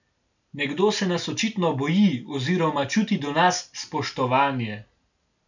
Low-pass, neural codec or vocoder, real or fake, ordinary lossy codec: 7.2 kHz; none; real; none